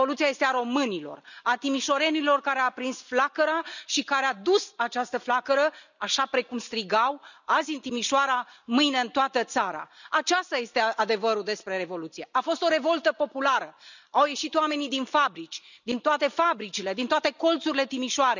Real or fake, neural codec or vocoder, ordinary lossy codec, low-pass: real; none; none; 7.2 kHz